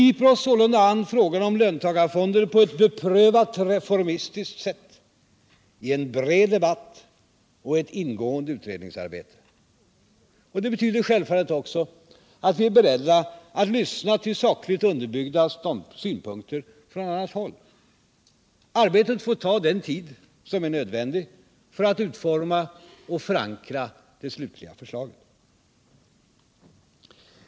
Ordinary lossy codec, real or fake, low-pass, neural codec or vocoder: none; real; none; none